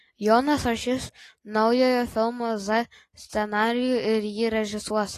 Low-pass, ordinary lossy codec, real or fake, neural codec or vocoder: 14.4 kHz; AAC, 48 kbps; real; none